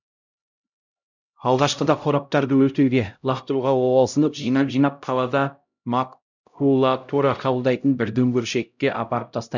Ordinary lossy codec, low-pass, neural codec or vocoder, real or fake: none; 7.2 kHz; codec, 16 kHz, 0.5 kbps, X-Codec, HuBERT features, trained on LibriSpeech; fake